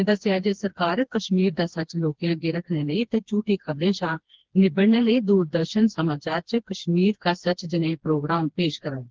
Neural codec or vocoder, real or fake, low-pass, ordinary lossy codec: codec, 16 kHz, 2 kbps, FreqCodec, smaller model; fake; 7.2 kHz; Opus, 16 kbps